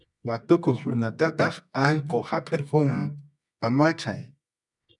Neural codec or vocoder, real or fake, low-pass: codec, 24 kHz, 0.9 kbps, WavTokenizer, medium music audio release; fake; 10.8 kHz